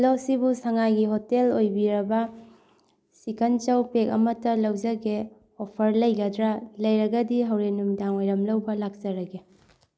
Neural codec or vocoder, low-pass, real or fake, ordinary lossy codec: none; none; real; none